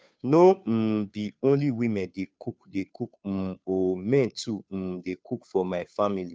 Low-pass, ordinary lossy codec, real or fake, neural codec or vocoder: none; none; fake; codec, 16 kHz, 2 kbps, FunCodec, trained on Chinese and English, 25 frames a second